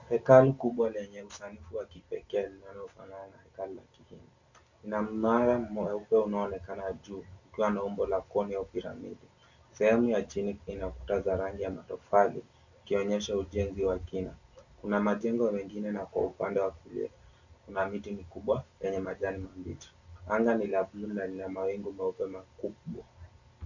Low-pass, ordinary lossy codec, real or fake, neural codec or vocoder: 7.2 kHz; Opus, 64 kbps; real; none